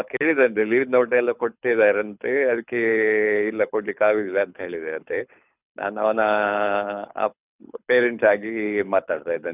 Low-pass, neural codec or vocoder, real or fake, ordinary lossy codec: 3.6 kHz; codec, 24 kHz, 6 kbps, HILCodec; fake; none